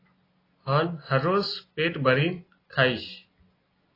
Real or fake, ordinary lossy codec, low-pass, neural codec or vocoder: real; AAC, 24 kbps; 5.4 kHz; none